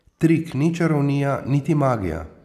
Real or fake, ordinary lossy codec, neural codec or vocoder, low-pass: real; none; none; 14.4 kHz